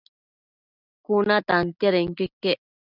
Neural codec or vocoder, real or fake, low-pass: none; real; 5.4 kHz